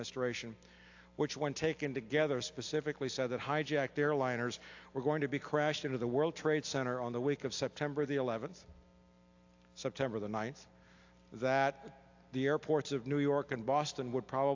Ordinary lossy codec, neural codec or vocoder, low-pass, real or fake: AAC, 48 kbps; none; 7.2 kHz; real